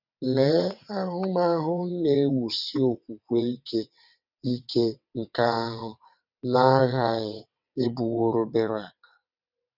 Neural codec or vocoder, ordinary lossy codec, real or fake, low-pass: vocoder, 22.05 kHz, 80 mel bands, WaveNeXt; none; fake; 5.4 kHz